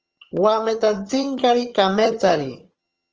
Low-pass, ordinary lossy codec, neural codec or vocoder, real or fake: 7.2 kHz; Opus, 24 kbps; vocoder, 22.05 kHz, 80 mel bands, HiFi-GAN; fake